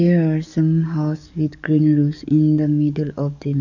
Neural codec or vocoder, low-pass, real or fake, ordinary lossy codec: codec, 44.1 kHz, 7.8 kbps, DAC; 7.2 kHz; fake; none